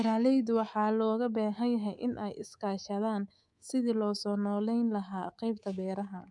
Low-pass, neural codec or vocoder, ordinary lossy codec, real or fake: 10.8 kHz; codec, 24 kHz, 3.1 kbps, DualCodec; none; fake